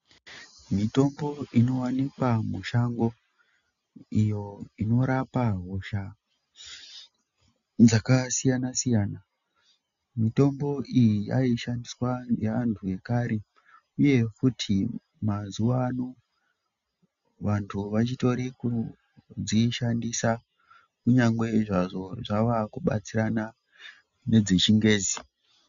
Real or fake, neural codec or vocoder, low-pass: real; none; 7.2 kHz